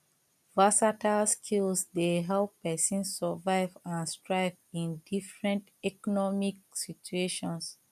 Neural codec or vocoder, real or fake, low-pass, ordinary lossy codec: none; real; 14.4 kHz; none